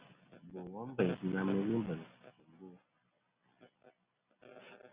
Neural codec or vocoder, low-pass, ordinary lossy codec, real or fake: none; 3.6 kHz; Opus, 64 kbps; real